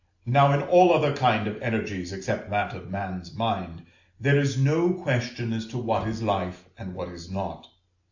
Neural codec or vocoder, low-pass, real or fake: none; 7.2 kHz; real